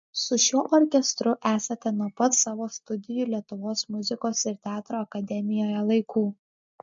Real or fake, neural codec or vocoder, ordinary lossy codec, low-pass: real; none; MP3, 48 kbps; 7.2 kHz